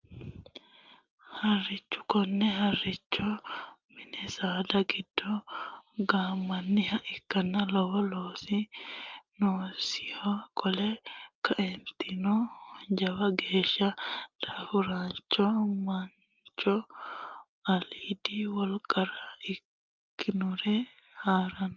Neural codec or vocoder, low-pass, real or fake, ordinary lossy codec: none; 7.2 kHz; real; Opus, 24 kbps